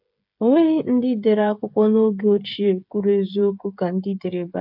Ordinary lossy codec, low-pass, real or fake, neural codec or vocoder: AAC, 48 kbps; 5.4 kHz; fake; codec, 16 kHz, 16 kbps, FreqCodec, smaller model